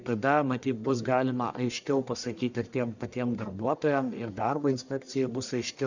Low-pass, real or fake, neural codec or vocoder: 7.2 kHz; fake; codec, 44.1 kHz, 1.7 kbps, Pupu-Codec